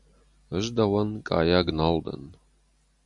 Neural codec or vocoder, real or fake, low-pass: none; real; 10.8 kHz